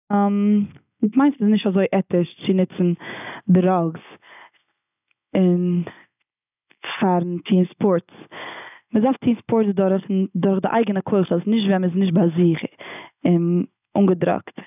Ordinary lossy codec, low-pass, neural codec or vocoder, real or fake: none; 3.6 kHz; none; real